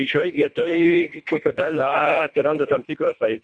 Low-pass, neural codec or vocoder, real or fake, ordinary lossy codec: 9.9 kHz; codec, 24 kHz, 1.5 kbps, HILCodec; fake; Opus, 64 kbps